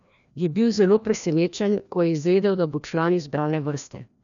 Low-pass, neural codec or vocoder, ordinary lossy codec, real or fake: 7.2 kHz; codec, 16 kHz, 1 kbps, FreqCodec, larger model; none; fake